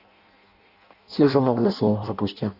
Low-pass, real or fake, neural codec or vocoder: 5.4 kHz; fake; codec, 16 kHz in and 24 kHz out, 0.6 kbps, FireRedTTS-2 codec